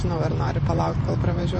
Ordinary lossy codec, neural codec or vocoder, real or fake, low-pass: MP3, 32 kbps; vocoder, 44.1 kHz, 128 mel bands every 512 samples, BigVGAN v2; fake; 10.8 kHz